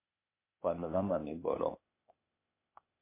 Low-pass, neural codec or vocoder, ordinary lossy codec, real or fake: 3.6 kHz; codec, 16 kHz, 0.8 kbps, ZipCodec; MP3, 24 kbps; fake